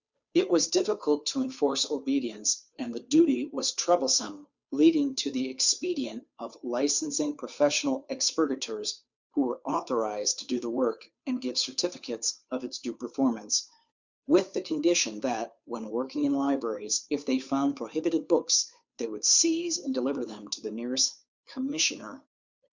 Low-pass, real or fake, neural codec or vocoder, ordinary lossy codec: 7.2 kHz; fake; codec, 16 kHz, 2 kbps, FunCodec, trained on Chinese and English, 25 frames a second; Opus, 64 kbps